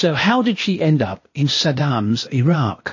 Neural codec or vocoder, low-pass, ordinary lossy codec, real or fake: codec, 16 kHz, 0.8 kbps, ZipCodec; 7.2 kHz; MP3, 32 kbps; fake